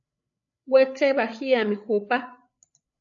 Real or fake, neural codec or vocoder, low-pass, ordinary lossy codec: fake; codec, 16 kHz, 8 kbps, FreqCodec, larger model; 7.2 kHz; AAC, 64 kbps